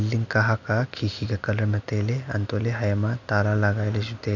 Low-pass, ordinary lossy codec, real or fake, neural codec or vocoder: 7.2 kHz; none; real; none